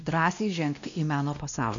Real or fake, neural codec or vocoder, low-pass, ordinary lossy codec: fake; codec, 16 kHz, 1 kbps, X-Codec, WavLM features, trained on Multilingual LibriSpeech; 7.2 kHz; MP3, 64 kbps